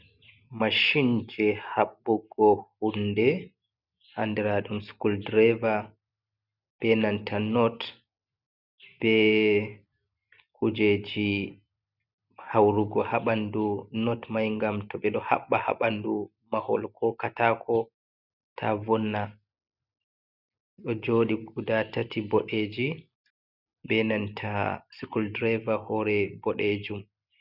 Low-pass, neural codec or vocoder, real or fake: 5.4 kHz; none; real